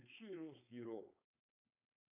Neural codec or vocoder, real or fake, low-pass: codec, 16 kHz, 4.8 kbps, FACodec; fake; 3.6 kHz